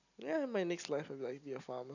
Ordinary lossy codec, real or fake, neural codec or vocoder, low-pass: none; real; none; 7.2 kHz